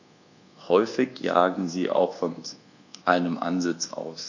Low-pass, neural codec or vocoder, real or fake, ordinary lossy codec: 7.2 kHz; codec, 24 kHz, 1.2 kbps, DualCodec; fake; none